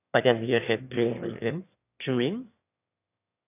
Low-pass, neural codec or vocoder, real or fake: 3.6 kHz; autoencoder, 22.05 kHz, a latent of 192 numbers a frame, VITS, trained on one speaker; fake